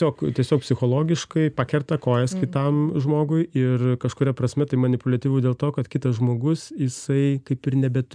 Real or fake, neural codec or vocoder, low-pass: real; none; 9.9 kHz